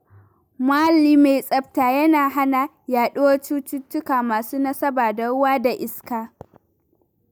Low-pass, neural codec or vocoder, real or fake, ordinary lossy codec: none; none; real; none